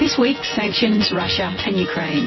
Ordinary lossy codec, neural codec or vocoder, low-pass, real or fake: MP3, 24 kbps; vocoder, 24 kHz, 100 mel bands, Vocos; 7.2 kHz; fake